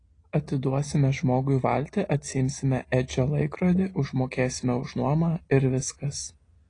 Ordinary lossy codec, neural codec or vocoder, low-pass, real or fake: AAC, 32 kbps; none; 9.9 kHz; real